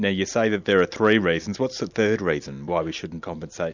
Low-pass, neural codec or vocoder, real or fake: 7.2 kHz; none; real